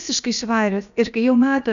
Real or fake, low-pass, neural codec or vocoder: fake; 7.2 kHz; codec, 16 kHz, about 1 kbps, DyCAST, with the encoder's durations